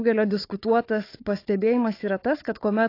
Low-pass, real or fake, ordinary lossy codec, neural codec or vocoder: 5.4 kHz; fake; AAC, 32 kbps; codec, 16 kHz, 4 kbps, FunCodec, trained on Chinese and English, 50 frames a second